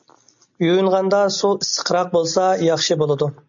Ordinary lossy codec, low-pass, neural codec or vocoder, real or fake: MP3, 96 kbps; 7.2 kHz; none; real